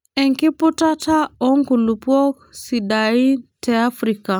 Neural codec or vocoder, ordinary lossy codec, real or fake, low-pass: none; none; real; none